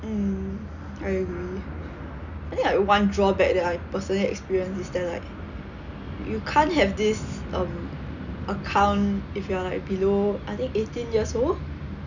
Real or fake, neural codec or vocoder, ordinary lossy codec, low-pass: real; none; none; 7.2 kHz